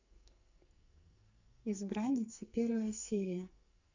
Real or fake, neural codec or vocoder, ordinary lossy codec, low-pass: fake; codec, 44.1 kHz, 2.6 kbps, SNAC; Opus, 64 kbps; 7.2 kHz